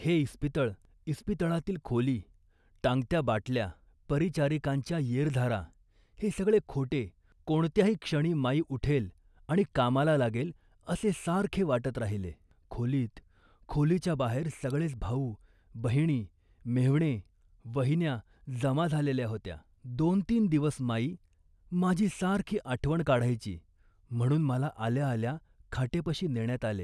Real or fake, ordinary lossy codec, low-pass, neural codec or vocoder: real; none; none; none